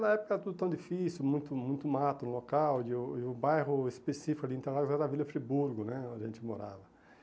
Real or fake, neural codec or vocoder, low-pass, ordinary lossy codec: real; none; none; none